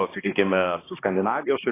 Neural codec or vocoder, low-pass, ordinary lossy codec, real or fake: codec, 16 kHz, 1 kbps, X-Codec, HuBERT features, trained on balanced general audio; 3.6 kHz; AAC, 16 kbps; fake